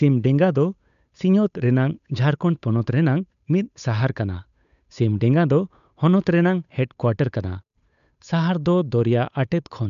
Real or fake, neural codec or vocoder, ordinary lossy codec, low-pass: fake; codec, 16 kHz, 8 kbps, FunCodec, trained on Chinese and English, 25 frames a second; none; 7.2 kHz